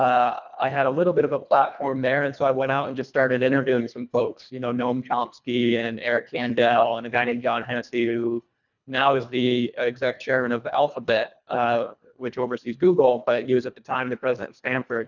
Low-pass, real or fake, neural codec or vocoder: 7.2 kHz; fake; codec, 24 kHz, 1.5 kbps, HILCodec